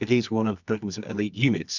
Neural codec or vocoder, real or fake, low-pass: codec, 24 kHz, 0.9 kbps, WavTokenizer, medium music audio release; fake; 7.2 kHz